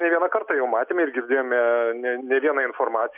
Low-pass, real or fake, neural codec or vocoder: 3.6 kHz; real; none